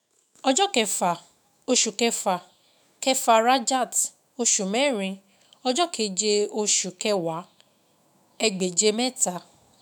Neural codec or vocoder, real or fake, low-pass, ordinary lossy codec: autoencoder, 48 kHz, 128 numbers a frame, DAC-VAE, trained on Japanese speech; fake; none; none